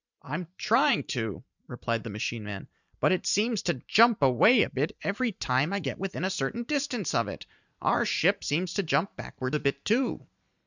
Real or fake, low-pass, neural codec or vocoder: fake; 7.2 kHz; vocoder, 44.1 kHz, 128 mel bands every 512 samples, BigVGAN v2